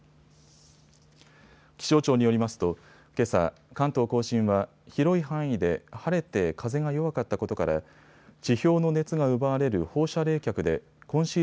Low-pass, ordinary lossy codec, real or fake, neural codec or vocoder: none; none; real; none